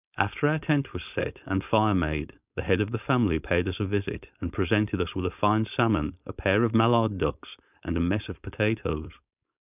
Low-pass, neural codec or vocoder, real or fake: 3.6 kHz; codec, 16 kHz, 4.8 kbps, FACodec; fake